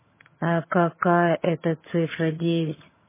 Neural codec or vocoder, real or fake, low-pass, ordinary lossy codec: vocoder, 22.05 kHz, 80 mel bands, HiFi-GAN; fake; 3.6 kHz; MP3, 16 kbps